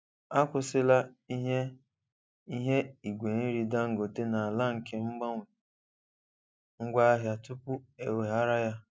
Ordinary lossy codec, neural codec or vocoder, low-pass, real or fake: none; none; none; real